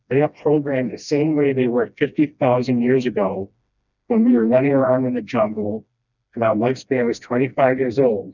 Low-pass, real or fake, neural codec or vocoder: 7.2 kHz; fake; codec, 16 kHz, 1 kbps, FreqCodec, smaller model